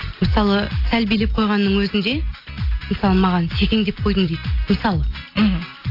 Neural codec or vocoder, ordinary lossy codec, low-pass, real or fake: none; AAC, 32 kbps; 5.4 kHz; real